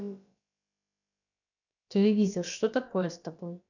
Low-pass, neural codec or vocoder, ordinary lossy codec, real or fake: 7.2 kHz; codec, 16 kHz, about 1 kbps, DyCAST, with the encoder's durations; none; fake